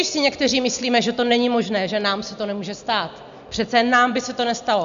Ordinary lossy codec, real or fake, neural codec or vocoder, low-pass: MP3, 64 kbps; real; none; 7.2 kHz